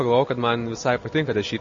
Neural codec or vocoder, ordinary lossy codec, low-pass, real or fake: none; MP3, 32 kbps; 7.2 kHz; real